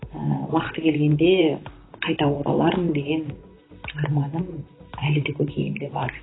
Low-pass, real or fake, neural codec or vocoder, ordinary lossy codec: 7.2 kHz; fake; vocoder, 44.1 kHz, 128 mel bands, Pupu-Vocoder; AAC, 16 kbps